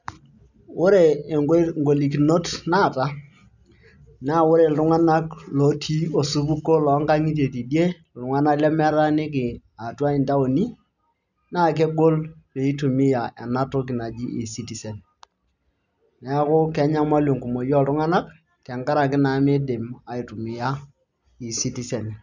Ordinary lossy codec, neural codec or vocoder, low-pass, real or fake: none; none; 7.2 kHz; real